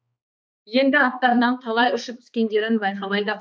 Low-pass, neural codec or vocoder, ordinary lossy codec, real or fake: none; codec, 16 kHz, 2 kbps, X-Codec, HuBERT features, trained on balanced general audio; none; fake